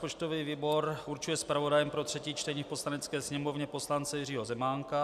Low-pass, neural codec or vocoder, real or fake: 14.4 kHz; none; real